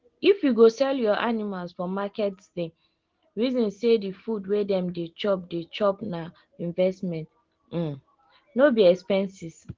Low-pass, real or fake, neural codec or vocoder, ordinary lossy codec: 7.2 kHz; real; none; Opus, 16 kbps